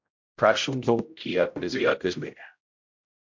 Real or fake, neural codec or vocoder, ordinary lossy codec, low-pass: fake; codec, 16 kHz, 0.5 kbps, X-Codec, HuBERT features, trained on general audio; MP3, 48 kbps; 7.2 kHz